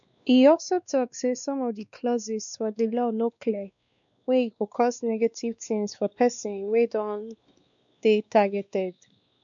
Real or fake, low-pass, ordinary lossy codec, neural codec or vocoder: fake; 7.2 kHz; none; codec, 16 kHz, 2 kbps, X-Codec, WavLM features, trained on Multilingual LibriSpeech